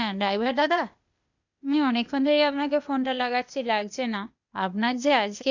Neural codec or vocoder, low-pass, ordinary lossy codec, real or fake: codec, 16 kHz, 0.8 kbps, ZipCodec; 7.2 kHz; none; fake